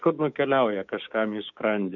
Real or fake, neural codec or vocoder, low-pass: fake; codec, 16 kHz, 6 kbps, DAC; 7.2 kHz